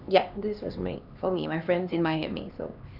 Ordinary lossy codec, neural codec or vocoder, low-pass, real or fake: none; codec, 16 kHz, 2 kbps, X-Codec, HuBERT features, trained on LibriSpeech; 5.4 kHz; fake